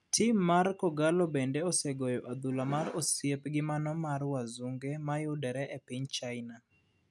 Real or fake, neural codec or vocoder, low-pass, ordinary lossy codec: real; none; none; none